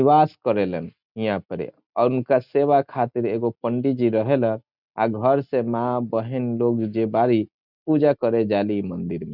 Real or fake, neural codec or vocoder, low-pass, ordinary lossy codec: real; none; 5.4 kHz; none